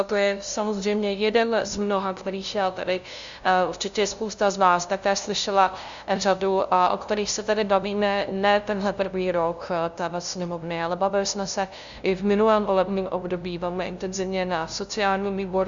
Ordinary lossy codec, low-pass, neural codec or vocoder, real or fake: Opus, 64 kbps; 7.2 kHz; codec, 16 kHz, 0.5 kbps, FunCodec, trained on LibriTTS, 25 frames a second; fake